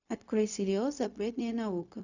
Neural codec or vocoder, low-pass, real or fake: codec, 16 kHz, 0.4 kbps, LongCat-Audio-Codec; 7.2 kHz; fake